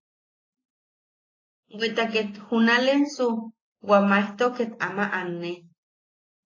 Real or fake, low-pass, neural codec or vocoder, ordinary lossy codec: real; 7.2 kHz; none; AAC, 32 kbps